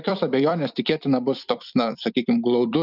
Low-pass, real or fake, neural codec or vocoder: 5.4 kHz; fake; vocoder, 44.1 kHz, 128 mel bands every 256 samples, BigVGAN v2